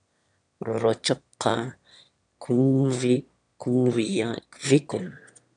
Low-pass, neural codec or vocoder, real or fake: 9.9 kHz; autoencoder, 22.05 kHz, a latent of 192 numbers a frame, VITS, trained on one speaker; fake